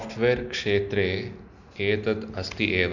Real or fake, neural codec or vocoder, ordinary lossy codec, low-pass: real; none; none; 7.2 kHz